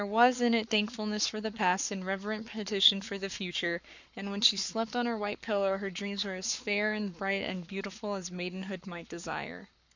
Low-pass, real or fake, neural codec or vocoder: 7.2 kHz; fake; codec, 44.1 kHz, 7.8 kbps, Pupu-Codec